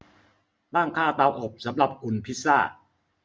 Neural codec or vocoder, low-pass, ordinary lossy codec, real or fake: none; none; none; real